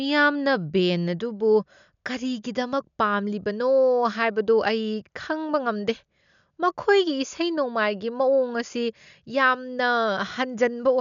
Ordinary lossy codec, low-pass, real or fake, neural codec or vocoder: MP3, 96 kbps; 7.2 kHz; real; none